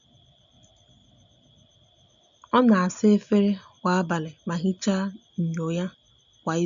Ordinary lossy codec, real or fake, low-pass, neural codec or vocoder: none; real; 7.2 kHz; none